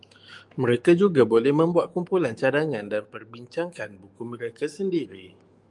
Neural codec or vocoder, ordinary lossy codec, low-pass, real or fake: autoencoder, 48 kHz, 128 numbers a frame, DAC-VAE, trained on Japanese speech; Opus, 32 kbps; 10.8 kHz; fake